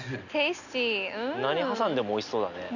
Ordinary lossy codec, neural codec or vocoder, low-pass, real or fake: none; none; 7.2 kHz; real